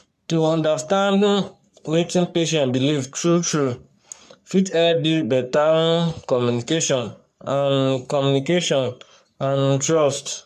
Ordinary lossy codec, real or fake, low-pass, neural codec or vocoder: none; fake; 14.4 kHz; codec, 44.1 kHz, 3.4 kbps, Pupu-Codec